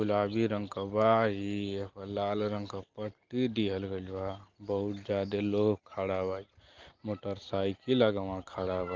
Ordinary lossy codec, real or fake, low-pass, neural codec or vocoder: Opus, 32 kbps; real; 7.2 kHz; none